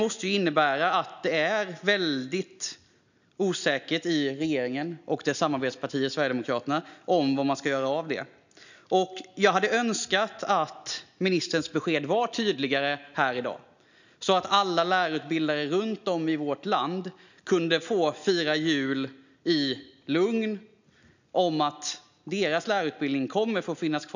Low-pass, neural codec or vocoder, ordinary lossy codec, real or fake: 7.2 kHz; none; none; real